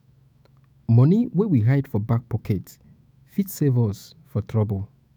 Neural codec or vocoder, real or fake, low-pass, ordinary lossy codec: autoencoder, 48 kHz, 128 numbers a frame, DAC-VAE, trained on Japanese speech; fake; none; none